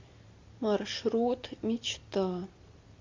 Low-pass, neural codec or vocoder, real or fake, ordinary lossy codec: 7.2 kHz; none; real; MP3, 48 kbps